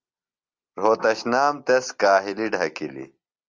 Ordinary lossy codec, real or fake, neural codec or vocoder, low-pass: Opus, 32 kbps; real; none; 7.2 kHz